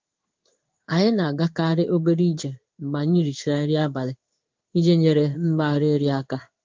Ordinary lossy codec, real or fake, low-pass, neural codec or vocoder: Opus, 24 kbps; fake; 7.2 kHz; codec, 16 kHz in and 24 kHz out, 1 kbps, XY-Tokenizer